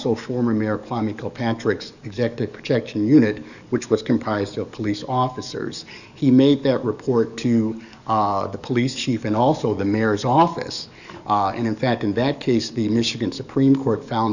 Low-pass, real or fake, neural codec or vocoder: 7.2 kHz; fake; codec, 44.1 kHz, 7.8 kbps, DAC